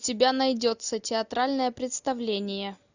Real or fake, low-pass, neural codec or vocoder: real; 7.2 kHz; none